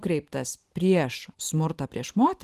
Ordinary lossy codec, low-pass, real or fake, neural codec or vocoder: Opus, 24 kbps; 14.4 kHz; fake; vocoder, 44.1 kHz, 128 mel bands every 512 samples, BigVGAN v2